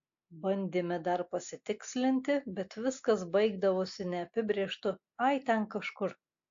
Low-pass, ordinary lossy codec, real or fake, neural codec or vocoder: 7.2 kHz; AAC, 48 kbps; real; none